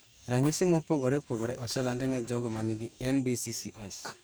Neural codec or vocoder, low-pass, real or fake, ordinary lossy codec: codec, 44.1 kHz, 2.6 kbps, DAC; none; fake; none